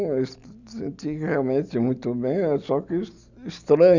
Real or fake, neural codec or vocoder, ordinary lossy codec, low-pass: real; none; none; 7.2 kHz